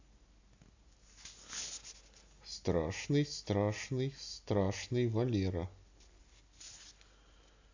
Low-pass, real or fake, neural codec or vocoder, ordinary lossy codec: 7.2 kHz; real; none; AAC, 48 kbps